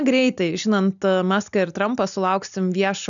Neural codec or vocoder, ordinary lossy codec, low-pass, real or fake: none; MP3, 96 kbps; 7.2 kHz; real